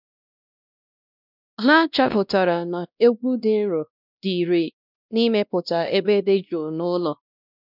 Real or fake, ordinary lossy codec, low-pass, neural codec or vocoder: fake; none; 5.4 kHz; codec, 16 kHz, 1 kbps, X-Codec, WavLM features, trained on Multilingual LibriSpeech